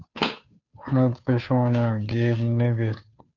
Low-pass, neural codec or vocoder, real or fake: 7.2 kHz; codec, 16 kHz, 2 kbps, FunCodec, trained on Chinese and English, 25 frames a second; fake